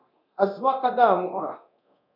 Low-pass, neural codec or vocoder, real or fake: 5.4 kHz; codec, 24 kHz, 0.9 kbps, DualCodec; fake